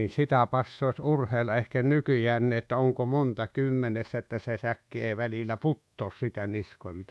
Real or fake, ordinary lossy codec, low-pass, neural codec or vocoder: fake; none; none; codec, 24 kHz, 1.2 kbps, DualCodec